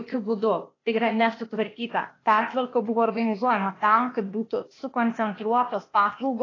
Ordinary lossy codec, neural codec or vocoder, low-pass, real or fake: AAC, 32 kbps; codec, 16 kHz, 0.8 kbps, ZipCodec; 7.2 kHz; fake